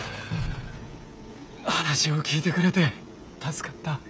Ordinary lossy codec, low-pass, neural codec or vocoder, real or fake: none; none; codec, 16 kHz, 16 kbps, FreqCodec, smaller model; fake